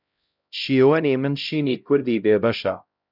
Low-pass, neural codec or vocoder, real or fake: 5.4 kHz; codec, 16 kHz, 0.5 kbps, X-Codec, HuBERT features, trained on LibriSpeech; fake